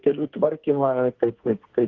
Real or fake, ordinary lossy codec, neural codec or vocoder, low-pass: fake; Opus, 16 kbps; codec, 44.1 kHz, 2.6 kbps, SNAC; 7.2 kHz